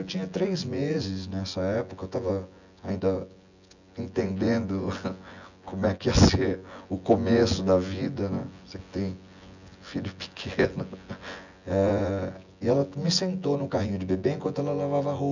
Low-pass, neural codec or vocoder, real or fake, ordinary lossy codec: 7.2 kHz; vocoder, 24 kHz, 100 mel bands, Vocos; fake; none